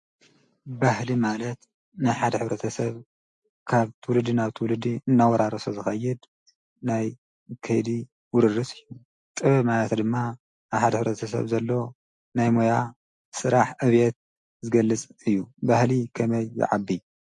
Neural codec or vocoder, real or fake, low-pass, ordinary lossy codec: none; real; 10.8 kHz; MP3, 48 kbps